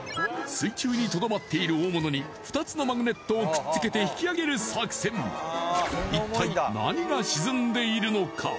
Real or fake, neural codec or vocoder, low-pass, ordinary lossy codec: real; none; none; none